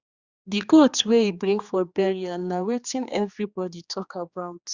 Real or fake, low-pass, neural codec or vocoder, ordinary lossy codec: fake; 7.2 kHz; codec, 16 kHz, 2 kbps, X-Codec, HuBERT features, trained on general audio; Opus, 64 kbps